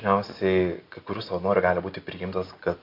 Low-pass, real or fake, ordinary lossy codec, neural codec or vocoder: 5.4 kHz; real; MP3, 48 kbps; none